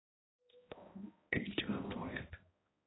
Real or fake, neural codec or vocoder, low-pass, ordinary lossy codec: fake; codec, 16 kHz, 0.5 kbps, X-Codec, HuBERT features, trained on balanced general audio; 7.2 kHz; AAC, 16 kbps